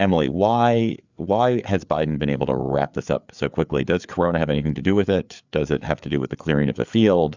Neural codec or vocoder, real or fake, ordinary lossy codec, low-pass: codec, 16 kHz, 4 kbps, FreqCodec, larger model; fake; Opus, 64 kbps; 7.2 kHz